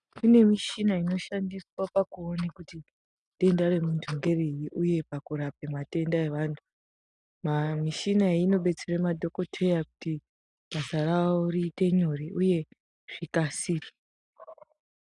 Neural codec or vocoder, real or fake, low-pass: none; real; 10.8 kHz